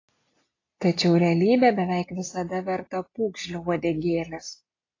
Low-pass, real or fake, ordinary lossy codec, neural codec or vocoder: 7.2 kHz; real; AAC, 32 kbps; none